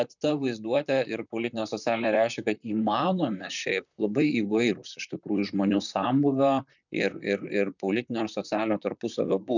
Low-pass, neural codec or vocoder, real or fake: 7.2 kHz; vocoder, 44.1 kHz, 128 mel bands, Pupu-Vocoder; fake